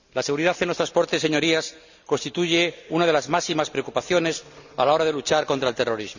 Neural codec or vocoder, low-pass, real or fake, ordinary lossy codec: none; 7.2 kHz; real; none